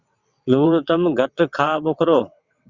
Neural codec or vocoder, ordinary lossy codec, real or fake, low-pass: vocoder, 22.05 kHz, 80 mel bands, WaveNeXt; Opus, 64 kbps; fake; 7.2 kHz